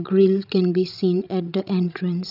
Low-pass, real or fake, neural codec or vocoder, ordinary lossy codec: 5.4 kHz; fake; codec, 16 kHz, 16 kbps, FreqCodec, larger model; none